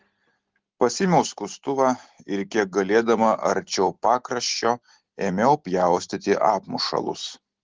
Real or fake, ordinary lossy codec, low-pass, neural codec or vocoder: real; Opus, 16 kbps; 7.2 kHz; none